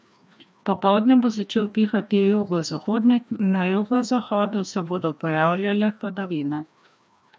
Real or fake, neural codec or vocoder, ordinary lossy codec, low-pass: fake; codec, 16 kHz, 1 kbps, FreqCodec, larger model; none; none